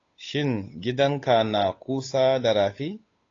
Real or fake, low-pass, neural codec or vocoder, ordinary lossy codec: fake; 7.2 kHz; codec, 16 kHz, 8 kbps, FunCodec, trained on Chinese and English, 25 frames a second; AAC, 32 kbps